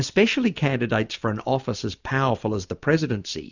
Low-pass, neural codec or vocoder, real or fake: 7.2 kHz; none; real